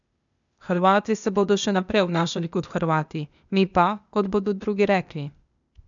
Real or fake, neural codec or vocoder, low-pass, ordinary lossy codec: fake; codec, 16 kHz, 0.8 kbps, ZipCodec; 7.2 kHz; none